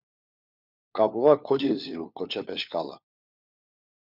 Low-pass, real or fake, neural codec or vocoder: 5.4 kHz; fake; codec, 16 kHz, 4 kbps, FunCodec, trained on LibriTTS, 50 frames a second